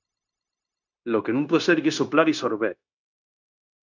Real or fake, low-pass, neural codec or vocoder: fake; 7.2 kHz; codec, 16 kHz, 0.9 kbps, LongCat-Audio-Codec